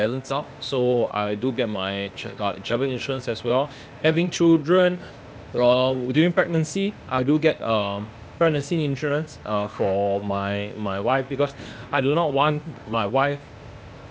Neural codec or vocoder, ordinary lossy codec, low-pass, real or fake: codec, 16 kHz, 0.8 kbps, ZipCodec; none; none; fake